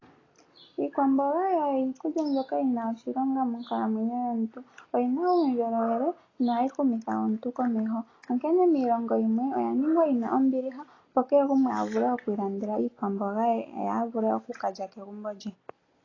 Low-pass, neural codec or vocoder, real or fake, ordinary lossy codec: 7.2 kHz; none; real; AAC, 32 kbps